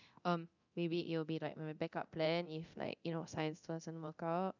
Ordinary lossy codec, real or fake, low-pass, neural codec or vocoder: none; fake; 7.2 kHz; codec, 24 kHz, 0.9 kbps, DualCodec